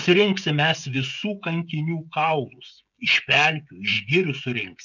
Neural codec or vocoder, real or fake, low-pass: codec, 16 kHz, 16 kbps, FreqCodec, smaller model; fake; 7.2 kHz